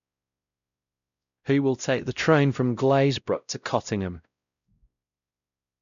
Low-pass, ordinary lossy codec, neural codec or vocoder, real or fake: 7.2 kHz; none; codec, 16 kHz, 0.5 kbps, X-Codec, WavLM features, trained on Multilingual LibriSpeech; fake